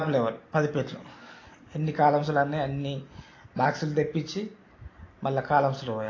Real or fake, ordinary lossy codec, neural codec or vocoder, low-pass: real; AAC, 32 kbps; none; 7.2 kHz